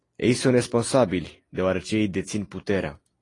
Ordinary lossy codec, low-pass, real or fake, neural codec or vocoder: AAC, 32 kbps; 10.8 kHz; real; none